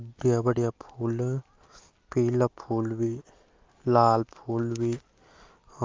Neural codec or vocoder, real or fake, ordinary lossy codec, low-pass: none; real; Opus, 32 kbps; 7.2 kHz